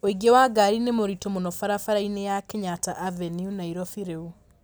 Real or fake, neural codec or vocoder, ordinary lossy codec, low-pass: real; none; none; none